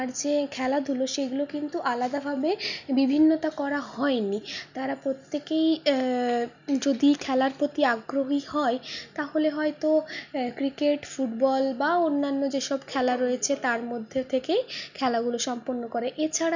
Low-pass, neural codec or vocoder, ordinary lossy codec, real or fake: 7.2 kHz; none; none; real